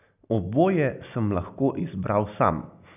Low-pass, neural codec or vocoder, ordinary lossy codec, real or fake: 3.6 kHz; none; none; real